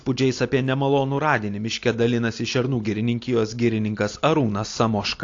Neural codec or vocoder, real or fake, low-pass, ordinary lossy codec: none; real; 7.2 kHz; AAC, 48 kbps